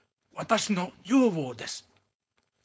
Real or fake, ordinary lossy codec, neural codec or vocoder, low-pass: fake; none; codec, 16 kHz, 4.8 kbps, FACodec; none